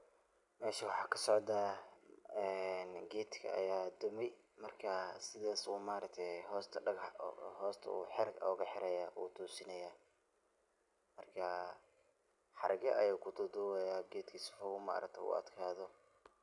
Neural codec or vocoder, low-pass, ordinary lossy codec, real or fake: none; 10.8 kHz; none; real